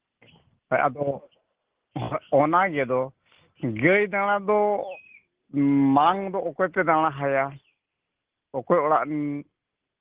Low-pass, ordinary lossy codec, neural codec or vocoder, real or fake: 3.6 kHz; Opus, 16 kbps; none; real